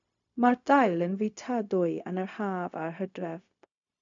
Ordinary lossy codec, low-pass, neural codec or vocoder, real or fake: AAC, 64 kbps; 7.2 kHz; codec, 16 kHz, 0.4 kbps, LongCat-Audio-Codec; fake